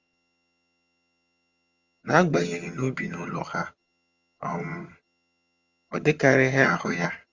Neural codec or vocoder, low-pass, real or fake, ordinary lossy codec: vocoder, 22.05 kHz, 80 mel bands, HiFi-GAN; 7.2 kHz; fake; Opus, 32 kbps